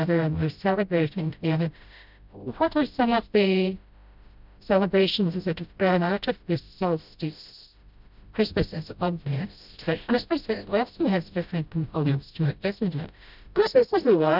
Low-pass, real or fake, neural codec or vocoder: 5.4 kHz; fake; codec, 16 kHz, 0.5 kbps, FreqCodec, smaller model